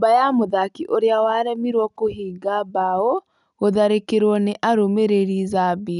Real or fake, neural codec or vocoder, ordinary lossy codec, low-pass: real; none; none; 10.8 kHz